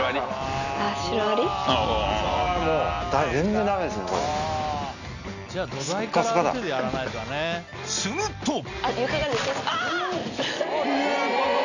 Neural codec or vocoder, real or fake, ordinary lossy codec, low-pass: none; real; none; 7.2 kHz